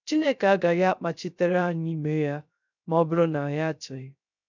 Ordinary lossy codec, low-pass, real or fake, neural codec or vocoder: none; 7.2 kHz; fake; codec, 16 kHz, 0.3 kbps, FocalCodec